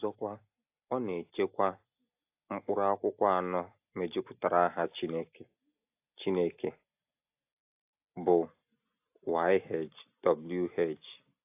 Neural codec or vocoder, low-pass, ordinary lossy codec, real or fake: none; 3.6 kHz; AAC, 32 kbps; real